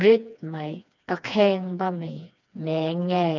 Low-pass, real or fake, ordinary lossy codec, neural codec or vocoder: 7.2 kHz; fake; none; codec, 16 kHz, 2 kbps, FreqCodec, smaller model